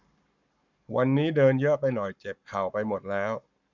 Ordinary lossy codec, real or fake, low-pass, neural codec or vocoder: none; fake; 7.2 kHz; codec, 44.1 kHz, 7.8 kbps, Pupu-Codec